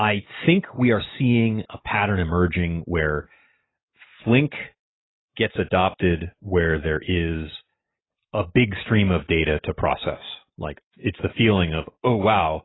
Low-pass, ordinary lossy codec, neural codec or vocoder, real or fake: 7.2 kHz; AAC, 16 kbps; none; real